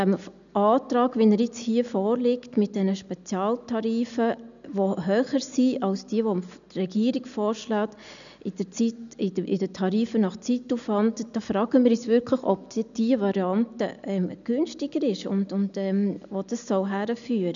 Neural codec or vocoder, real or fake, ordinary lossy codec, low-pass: none; real; none; 7.2 kHz